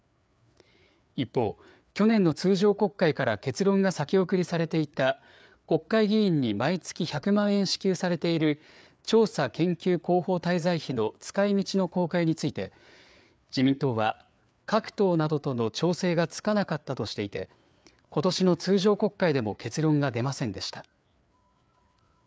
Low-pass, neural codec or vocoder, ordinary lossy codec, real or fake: none; codec, 16 kHz, 4 kbps, FreqCodec, larger model; none; fake